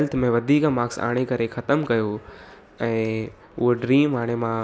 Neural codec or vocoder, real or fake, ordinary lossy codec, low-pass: none; real; none; none